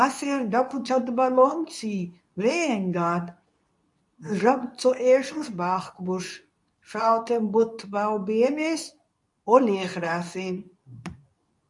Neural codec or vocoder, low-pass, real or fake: codec, 24 kHz, 0.9 kbps, WavTokenizer, medium speech release version 2; 10.8 kHz; fake